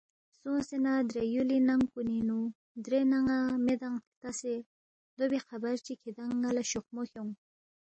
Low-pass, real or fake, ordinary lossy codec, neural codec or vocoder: 9.9 kHz; real; MP3, 32 kbps; none